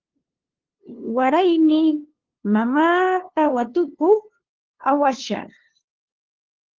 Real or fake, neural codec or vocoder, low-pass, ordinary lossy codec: fake; codec, 16 kHz, 2 kbps, FunCodec, trained on LibriTTS, 25 frames a second; 7.2 kHz; Opus, 16 kbps